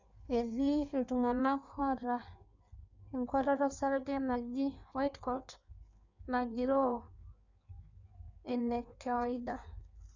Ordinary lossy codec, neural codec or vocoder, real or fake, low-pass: none; codec, 16 kHz in and 24 kHz out, 1.1 kbps, FireRedTTS-2 codec; fake; 7.2 kHz